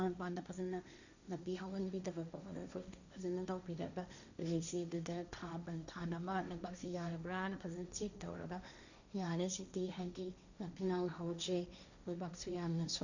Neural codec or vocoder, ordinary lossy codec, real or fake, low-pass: codec, 16 kHz, 1.1 kbps, Voila-Tokenizer; none; fake; 7.2 kHz